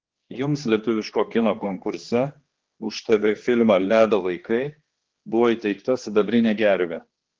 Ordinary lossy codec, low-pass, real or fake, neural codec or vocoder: Opus, 16 kbps; 7.2 kHz; fake; codec, 16 kHz, 2 kbps, X-Codec, HuBERT features, trained on general audio